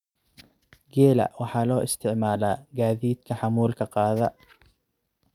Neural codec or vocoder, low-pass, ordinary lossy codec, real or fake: none; 19.8 kHz; none; real